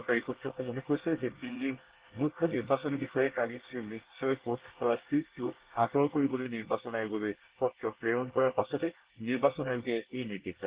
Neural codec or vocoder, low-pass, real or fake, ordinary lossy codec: codec, 24 kHz, 1 kbps, SNAC; 3.6 kHz; fake; Opus, 16 kbps